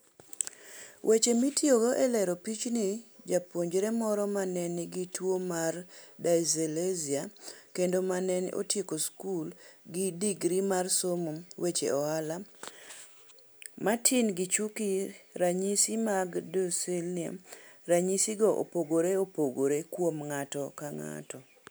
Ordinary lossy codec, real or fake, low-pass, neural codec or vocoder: none; real; none; none